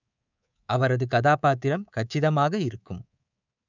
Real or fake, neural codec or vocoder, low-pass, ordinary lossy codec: fake; codec, 24 kHz, 3.1 kbps, DualCodec; 7.2 kHz; none